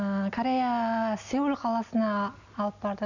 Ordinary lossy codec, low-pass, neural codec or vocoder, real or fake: none; 7.2 kHz; none; real